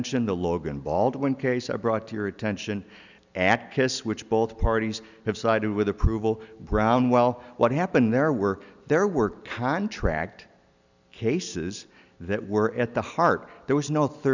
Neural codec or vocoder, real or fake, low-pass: none; real; 7.2 kHz